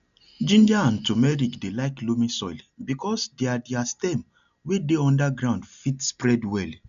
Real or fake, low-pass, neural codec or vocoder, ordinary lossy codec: real; 7.2 kHz; none; none